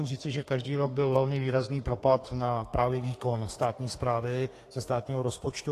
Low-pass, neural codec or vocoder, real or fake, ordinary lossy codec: 14.4 kHz; codec, 32 kHz, 1.9 kbps, SNAC; fake; AAC, 48 kbps